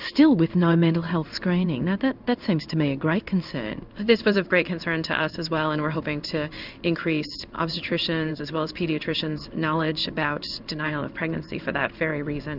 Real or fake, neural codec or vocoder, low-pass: fake; codec, 16 kHz in and 24 kHz out, 1 kbps, XY-Tokenizer; 5.4 kHz